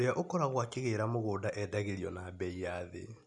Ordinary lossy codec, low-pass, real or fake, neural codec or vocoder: none; 10.8 kHz; real; none